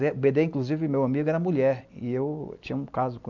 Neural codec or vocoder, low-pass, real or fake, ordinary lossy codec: none; 7.2 kHz; real; none